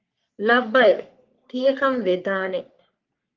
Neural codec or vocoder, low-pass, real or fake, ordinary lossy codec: codec, 44.1 kHz, 3.4 kbps, Pupu-Codec; 7.2 kHz; fake; Opus, 32 kbps